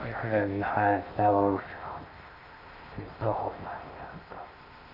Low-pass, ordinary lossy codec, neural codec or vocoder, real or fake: 5.4 kHz; none; codec, 16 kHz in and 24 kHz out, 0.6 kbps, FocalCodec, streaming, 2048 codes; fake